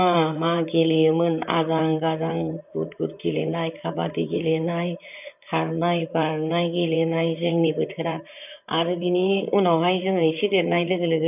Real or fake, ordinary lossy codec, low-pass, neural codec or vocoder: fake; none; 3.6 kHz; vocoder, 44.1 kHz, 128 mel bands, Pupu-Vocoder